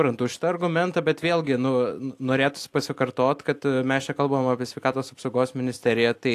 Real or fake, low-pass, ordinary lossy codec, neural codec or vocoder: real; 14.4 kHz; AAC, 64 kbps; none